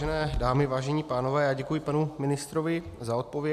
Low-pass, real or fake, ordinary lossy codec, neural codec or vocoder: 14.4 kHz; real; MP3, 96 kbps; none